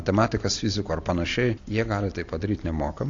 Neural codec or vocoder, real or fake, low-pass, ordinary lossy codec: none; real; 7.2 kHz; AAC, 48 kbps